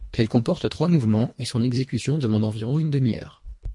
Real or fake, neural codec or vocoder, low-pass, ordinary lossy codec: fake; codec, 24 kHz, 1.5 kbps, HILCodec; 10.8 kHz; MP3, 48 kbps